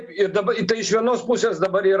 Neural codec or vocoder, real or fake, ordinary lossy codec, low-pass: none; real; Opus, 64 kbps; 9.9 kHz